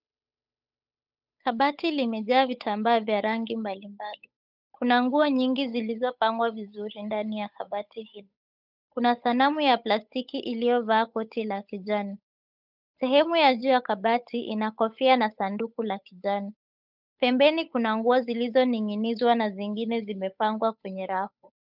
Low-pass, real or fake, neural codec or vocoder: 5.4 kHz; fake; codec, 16 kHz, 8 kbps, FunCodec, trained on Chinese and English, 25 frames a second